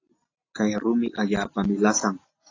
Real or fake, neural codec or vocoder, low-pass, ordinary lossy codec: real; none; 7.2 kHz; AAC, 32 kbps